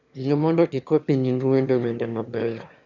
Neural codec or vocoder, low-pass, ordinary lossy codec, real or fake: autoencoder, 22.05 kHz, a latent of 192 numbers a frame, VITS, trained on one speaker; 7.2 kHz; none; fake